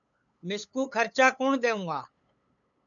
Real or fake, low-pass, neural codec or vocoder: fake; 7.2 kHz; codec, 16 kHz, 8 kbps, FunCodec, trained on LibriTTS, 25 frames a second